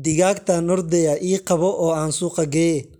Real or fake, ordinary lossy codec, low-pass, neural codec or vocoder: real; none; 19.8 kHz; none